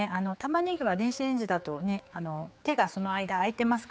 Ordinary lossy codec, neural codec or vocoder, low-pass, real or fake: none; codec, 16 kHz, 4 kbps, X-Codec, HuBERT features, trained on general audio; none; fake